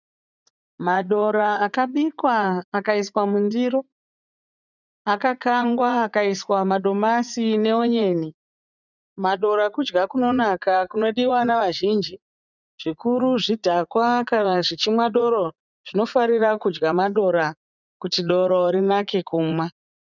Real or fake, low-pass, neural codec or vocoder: fake; 7.2 kHz; vocoder, 44.1 kHz, 80 mel bands, Vocos